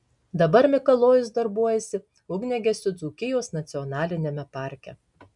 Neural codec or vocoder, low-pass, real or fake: none; 10.8 kHz; real